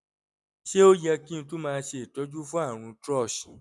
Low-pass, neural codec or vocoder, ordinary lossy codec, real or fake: none; none; none; real